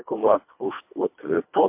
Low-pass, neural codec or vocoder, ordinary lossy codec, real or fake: 3.6 kHz; codec, 24 kHz, 1.5 kbps, HILCodec; MP3, 32 kbps; fake